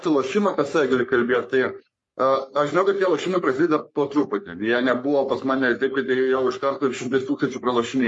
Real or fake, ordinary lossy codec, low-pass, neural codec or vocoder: fake; MP3, 48 kbps; 10.8 kHz; codec, 44.1 kHz, 3.4 kbps, Pupu-Codec